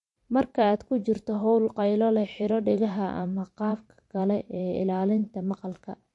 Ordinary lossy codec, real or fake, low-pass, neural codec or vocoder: MP3, 48 kbps; fake; 10.8 kHz; vocoder, 44.1 kHz, 128 mel bands every 512 samples, BigVGAN v2